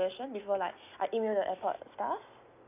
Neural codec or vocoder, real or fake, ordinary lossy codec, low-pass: none; real; none; 3.6 kHz